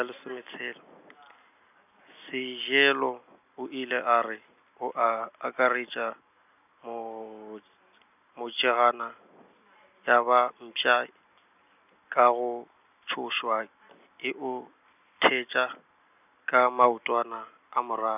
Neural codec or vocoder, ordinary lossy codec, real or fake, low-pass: none; none; real; 3.6 kHz